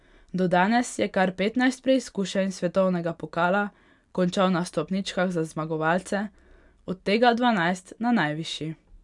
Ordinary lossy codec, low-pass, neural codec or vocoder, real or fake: none; 10.8 kHz; none; real